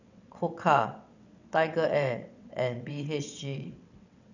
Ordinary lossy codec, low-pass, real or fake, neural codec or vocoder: none; 7.2 kHz; fake; vocoder, 22.05 kHz, 80 mel bands, WaveNeXt